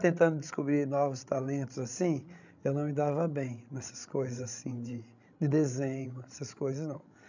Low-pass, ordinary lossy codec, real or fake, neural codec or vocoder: 7.2 kHz; none; fake; codec, 16 kHz, 16 kbps, FreqCodec, larger model